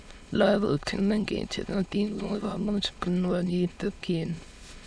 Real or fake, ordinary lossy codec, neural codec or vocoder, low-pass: fake; none; autoencoder, 22.05 kHz, a latent of 192 numbers a frame, VITS, trained on many speakers; none